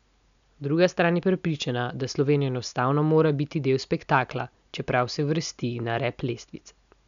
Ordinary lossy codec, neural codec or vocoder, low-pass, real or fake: none; none; 7.2 kHz; real